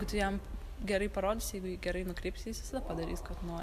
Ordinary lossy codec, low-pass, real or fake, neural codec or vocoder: AAC, 96 kbps; 14.4 kHz; real; none